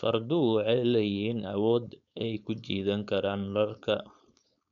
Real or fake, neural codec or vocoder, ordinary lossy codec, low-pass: fake; codec, 16 kHz, 4.8 kbps, FACodec; none; 7.2 kHz